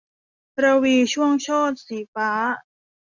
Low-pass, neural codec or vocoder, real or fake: 7.2 kHz; none; real